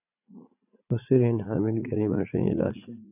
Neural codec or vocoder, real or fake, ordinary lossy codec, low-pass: vocoder, 44.1 kHz, 80 mel bands, Vocos; fake; AAC, 32 kbps; 3.6 kHz